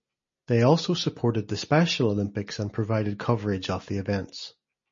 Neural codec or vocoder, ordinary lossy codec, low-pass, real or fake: none; MP3, 32 kbps; 7.2 kHz; real